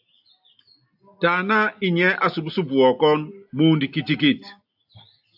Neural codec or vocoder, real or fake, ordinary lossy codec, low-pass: none; real; AAC, 48 kbps; 5.4 kHz